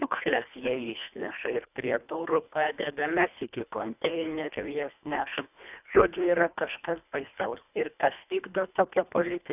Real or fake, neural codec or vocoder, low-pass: fake; codec, 24 kHz, 1.5 kbps, HILCodec; 3.6 kHz